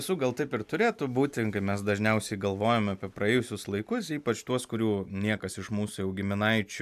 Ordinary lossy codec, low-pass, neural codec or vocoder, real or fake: AAC, 96 kbps; 14.4 kHz; none; real